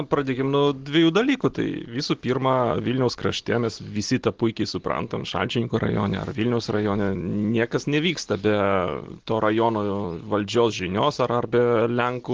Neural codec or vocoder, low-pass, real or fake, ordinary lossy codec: none; 7.2 kHz; real; Opus, 16 kbps